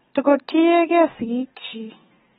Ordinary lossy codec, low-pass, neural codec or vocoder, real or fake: AAC, 16 kbps; 19.8 kHz; none; real